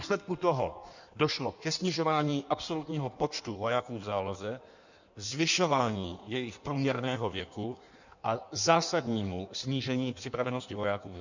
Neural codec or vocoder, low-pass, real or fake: codec, 16 kHz in and 24 kHz out, 1.1 kbps, FireRedTTS-2 codec; 7.2 kHz; fake